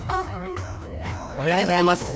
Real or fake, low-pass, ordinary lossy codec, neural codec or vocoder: fake; none; none; codec, 16 kHz, 1 kbps, FreqCodec, larger model